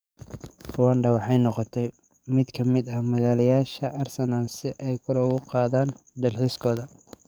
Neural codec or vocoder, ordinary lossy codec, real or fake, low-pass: codec, 44.1 kHz, 7.8 kbps, DAC; none; fake; none